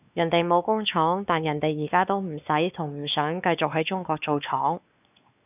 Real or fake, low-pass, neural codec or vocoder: fake; 3.6 kHz; codec, 16 kHz, 2 kbps, X-Codec, WavLM features, trained on Multilingual LibriSpeech